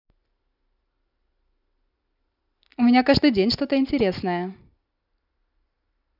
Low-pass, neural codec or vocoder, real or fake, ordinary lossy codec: 5.4 kHz; none; real; none